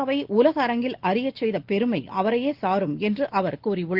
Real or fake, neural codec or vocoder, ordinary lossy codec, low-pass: real; none; Opus, 16 kbps; 5.4 kHz